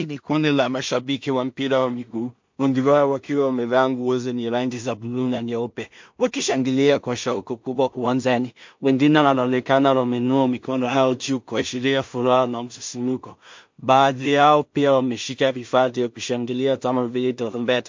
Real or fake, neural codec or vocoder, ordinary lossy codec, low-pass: fake; codec, 16 kHz in and 24 kHz out, 0.4 kbps, LongCat-Audio-Codec, two codebook decoder; MP3, 48 kbps; 7.2 kHz